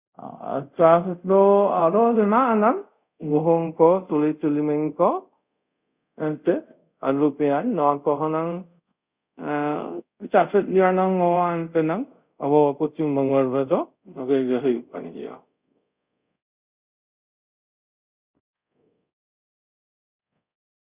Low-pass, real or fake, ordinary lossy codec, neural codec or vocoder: 3.6 kHz; fake; Opus, 64 kbps; codec, 24 kHz, 0.5 kbps, DualCodec